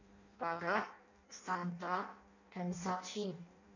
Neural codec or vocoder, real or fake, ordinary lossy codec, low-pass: codec, 16 kHz in and 24 kHz out, 0.6 kbps, FireRedTTS-2 codec; fake; none; 7.2 kHz